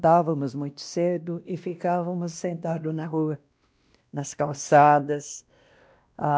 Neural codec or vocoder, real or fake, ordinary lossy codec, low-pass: codec, 16 kHz, 1 kbps, X-Codec, WavLM features, trained on Multilingual LibriSpeech; fake; none; none